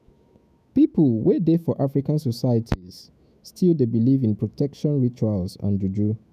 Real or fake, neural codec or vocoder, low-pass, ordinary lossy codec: fake; autoencoder, 48 kHz, 128 numbers a frame, DAC-VAE, trained on Japanese speech; 14.4 kHz; none